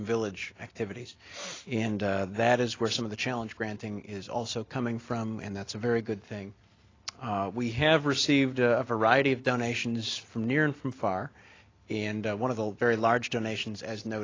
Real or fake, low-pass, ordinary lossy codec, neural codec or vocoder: real; 7.2 kHz; AAC, 32 kbps; none